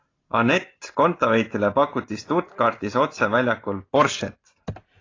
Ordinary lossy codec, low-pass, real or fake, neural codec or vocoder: AAC, 32 kbps; 7.2 kHz; real; none